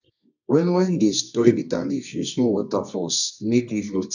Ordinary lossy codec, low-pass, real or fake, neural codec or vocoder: none; 7.2 kHz; fake; codec, 24 kHz, 0.9 kbps, WavTokenizer, medium music audio release